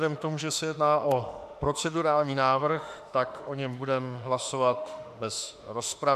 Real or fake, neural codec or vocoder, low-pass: fake; autoencoder, 48 kHz, 32 numbers a frame, DAC-VAE, trained on Japanese speech; 14.4 kHz